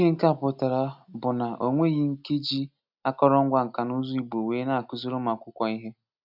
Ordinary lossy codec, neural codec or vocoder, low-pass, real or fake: none; none; 5.4 kHz; real